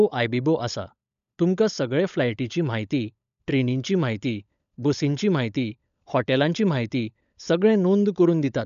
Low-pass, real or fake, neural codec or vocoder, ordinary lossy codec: 7.2 kHz; fake; codec, 16 kHz, 16 kbps, FunCodec, trained on LibriTTS, 50 frames a second; none